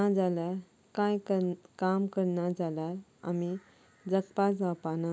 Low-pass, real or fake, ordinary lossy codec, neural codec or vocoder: none; real; none; none